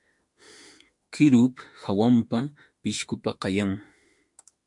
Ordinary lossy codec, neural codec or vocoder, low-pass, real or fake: MP3, 64 kbps; autoencoder, 48 kHz, 32 numbers a frame, DAC-VAE, trained on Japanese speech; 10.8 kHz; fake